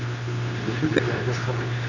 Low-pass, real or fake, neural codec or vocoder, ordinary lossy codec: 7.2 kHz; fake; codec, 24 kHz, 0.9 kbps, WavTokenizer, medium speech release version 2; none